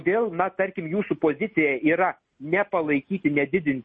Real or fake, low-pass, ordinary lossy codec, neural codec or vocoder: real; 9.9 kHz; MP3, 32 kbps; none